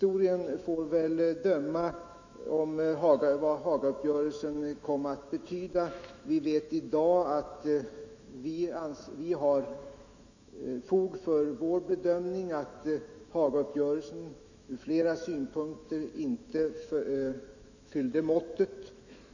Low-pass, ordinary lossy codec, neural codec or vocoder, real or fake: 7.2 kHz; none; autoencoder, 48 kHz, 128 numbers a frame, DAC-VAE, trained on Japanese speech; fake